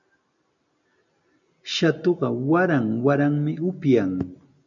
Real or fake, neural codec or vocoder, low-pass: real; none; 7.2 kHz